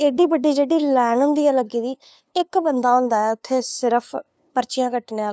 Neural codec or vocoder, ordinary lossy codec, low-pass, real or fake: codec, 16 kHz, 2 kbps, FunCodec, trained on LibriTTS, 25 frames a second; none; none; fake